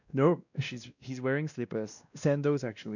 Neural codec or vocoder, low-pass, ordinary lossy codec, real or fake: codec, 16 kHz, 1 kbps, X-Codec, WavLM features, trained on Multilingual LibriSpeech; 7.2 kHz; none; fake